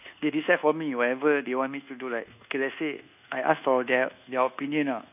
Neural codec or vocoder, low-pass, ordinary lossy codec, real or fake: codec, 24 kHz, 1.2 kbps, DualCodec; 3.6 kHz; none; fake